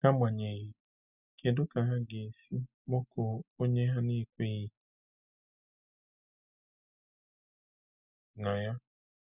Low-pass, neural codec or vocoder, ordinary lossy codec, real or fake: 3.6 kHz; none; none; real